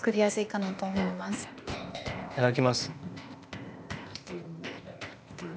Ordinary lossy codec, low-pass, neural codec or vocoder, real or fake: none; none; codec, 16 kHz, 0.8 kbps, ZipCodec; fake